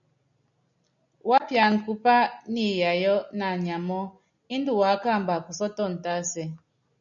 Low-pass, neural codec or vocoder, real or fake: 7.2 kHz; none; real